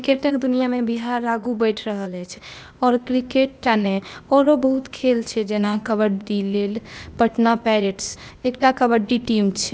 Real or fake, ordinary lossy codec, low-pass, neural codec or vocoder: fake; none; none; codec, 16 kHz, 0.8 kbps, ZipCodec